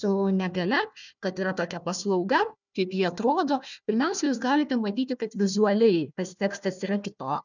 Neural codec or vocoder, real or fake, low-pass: codec, 16 kHz, 1 kbps, FunCodec, trained on Chinese and English, 50 frames a second; fake; 7.2 kHz